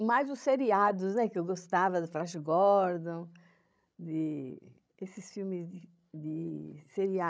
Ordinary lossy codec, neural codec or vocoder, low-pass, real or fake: none; codec, 16 kHz, 16 kbps, FreqCodec, larger model; none; fake